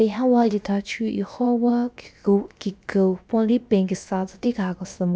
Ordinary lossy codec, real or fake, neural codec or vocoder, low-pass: none; fake; codec, 16 kHz, about 1 kbps, DyCAST, with the encoder's durations; none